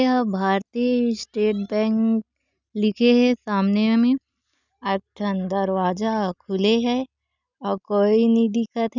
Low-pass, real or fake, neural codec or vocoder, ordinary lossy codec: 7.2 kHz; real; none; none